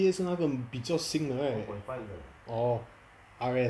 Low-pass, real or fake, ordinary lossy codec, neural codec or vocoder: none; real; none; none